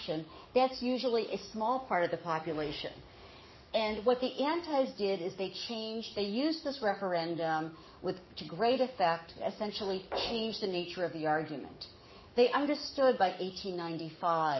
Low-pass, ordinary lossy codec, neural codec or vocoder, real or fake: 7.2 kHz; MP3, 24 kbps; codec, 44.1 kHz, 7.8 kbps, DAC; fake